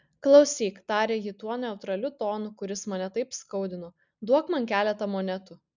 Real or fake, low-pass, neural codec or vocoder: real; 7.2 kHz; none